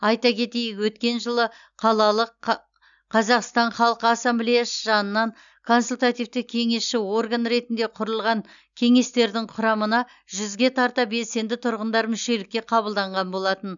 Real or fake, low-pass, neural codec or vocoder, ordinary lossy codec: real; 7.2 kHz; none; none